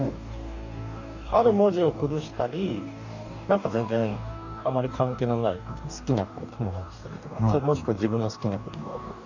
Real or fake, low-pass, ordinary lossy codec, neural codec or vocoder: fake; 7.2 kHz; none; codec, 44.1 kHz, 2.6 kbps, DAC